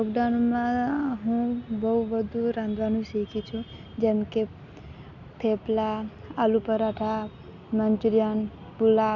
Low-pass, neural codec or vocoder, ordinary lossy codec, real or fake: 7.2 kHz; none; none; real